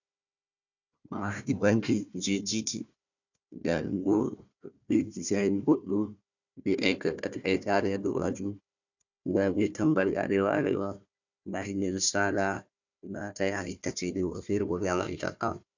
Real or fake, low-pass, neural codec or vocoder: fake; 7.2 kHz; codec, 16 kHz, 1 kbps, FunCodec, trained on Chinese and English, 50 frames a second